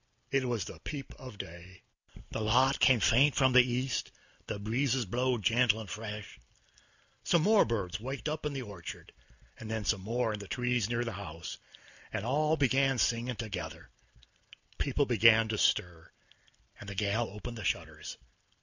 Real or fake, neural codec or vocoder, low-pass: real; none; 7.2 kHz